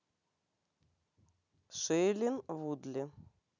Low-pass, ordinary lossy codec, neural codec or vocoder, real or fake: 7.2 kHz; none; none; real